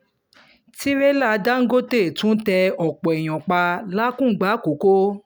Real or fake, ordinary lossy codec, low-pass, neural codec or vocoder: real; none; none; none